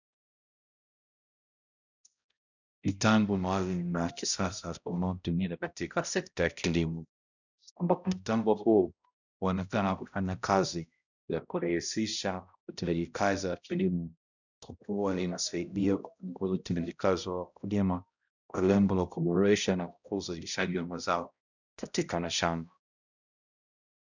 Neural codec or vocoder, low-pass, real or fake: codec, 16 kHz, 0.5 kbps, X-Codec, HuBERT features, trained on balanced general audio; 7.2 kHz; fake